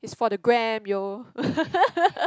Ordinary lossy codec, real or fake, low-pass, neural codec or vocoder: none; real; none; none